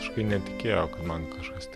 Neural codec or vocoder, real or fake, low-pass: none; real; 14.4 kHz